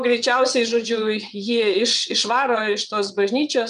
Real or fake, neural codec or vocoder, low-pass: fake; vocoder, 22.05 kHz, 80 mel bands, WaveNeXt; 9.9 kHz